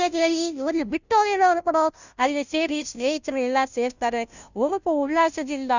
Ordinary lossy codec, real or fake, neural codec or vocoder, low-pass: none; fake; codec, 16 kHz, 0.5 kbps, FunCodec, trained on Chinese and English, 25 frames a second; 7.2 kHz